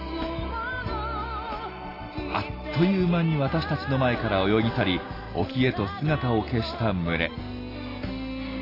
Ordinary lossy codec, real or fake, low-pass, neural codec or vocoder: AAC, 24 kbps; real; 5.4 kHz; none